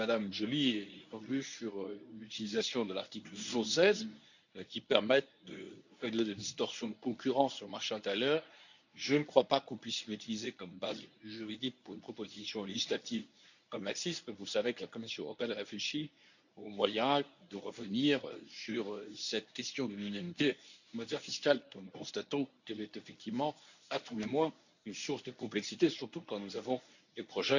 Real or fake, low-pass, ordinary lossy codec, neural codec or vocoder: fake; 7.2 kHz; none; codec, 24 kHz, 0.9 kbps, WavTokenizer, medium speech release version 1